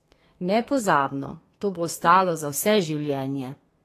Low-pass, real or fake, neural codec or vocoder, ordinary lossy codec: 14.4 kHz; fake; codec, 32 kHz, 1.9 kbps, SNAC; AAC, 48 kbps